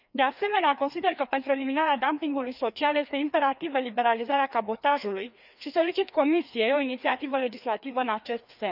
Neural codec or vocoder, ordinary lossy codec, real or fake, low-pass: codec, 16 kHz, 2 kbps, FreqCodec, larger model; none; fake; 5.4 kHz